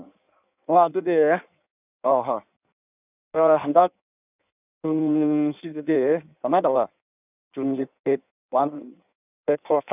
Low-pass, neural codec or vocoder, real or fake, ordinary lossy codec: 3.6 kHz; codec, 16 kHz in and 24 kHz out, 1.1 kbps, FireRedTTS-2 codec; fake; none